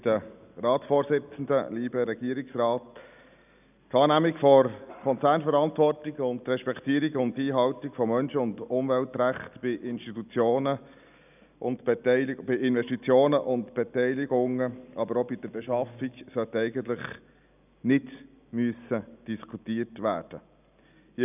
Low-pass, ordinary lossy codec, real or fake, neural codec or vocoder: 3.6 kHz; none; real; none